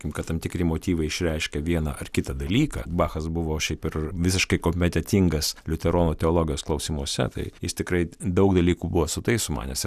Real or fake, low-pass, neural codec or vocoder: real; 14.4 kHz; none